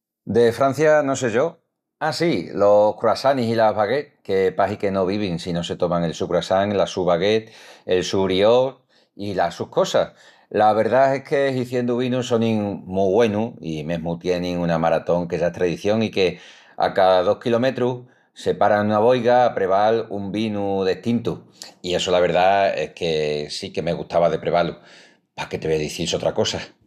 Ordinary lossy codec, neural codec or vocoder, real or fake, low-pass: none; none; real; 14.4 kHz